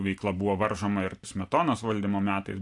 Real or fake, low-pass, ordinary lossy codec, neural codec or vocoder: real; 10.8 kHz; AAC, 64 kbps; none